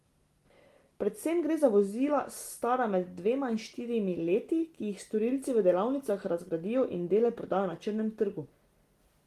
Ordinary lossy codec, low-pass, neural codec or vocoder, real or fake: Opus, 24 kbps; 14.4 kHz; none; real